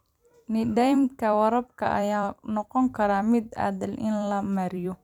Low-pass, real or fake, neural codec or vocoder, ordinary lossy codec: 19.8 kHz; fake; vocoder, 44.1 kHz, 128 mel bands every 256 samples, BigVGAN v2; none